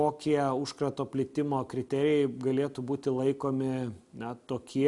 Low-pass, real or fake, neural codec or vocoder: 10.8 kHz; real; none